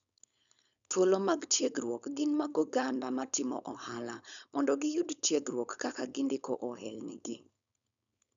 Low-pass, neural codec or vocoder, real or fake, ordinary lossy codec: 7.2 kHz; codec, 16 kHz, 4.8 kbps, FACodec; fake; none